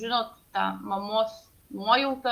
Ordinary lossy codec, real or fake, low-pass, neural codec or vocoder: Opus, 24 kbps; real; 14.4 kHz; none